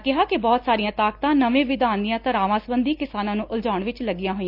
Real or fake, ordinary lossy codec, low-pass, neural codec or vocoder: real; Opus, 24 kbps; 5.4 kHz; none